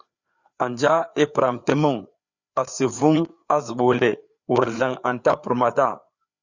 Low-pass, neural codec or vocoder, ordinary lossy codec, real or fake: 7.2 kHz; codec, 16 kHz, 4 kbps, FreqCodec, larger model; Opus, 64 kbps; fake